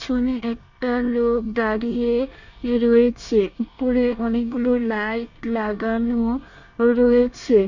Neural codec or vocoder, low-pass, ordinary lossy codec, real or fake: codec, 24 kHz, 1 kbps, SNAC; 7.2 kHz; none; fake